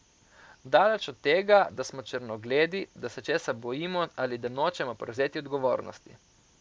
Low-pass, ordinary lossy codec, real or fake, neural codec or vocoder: none; none; real; none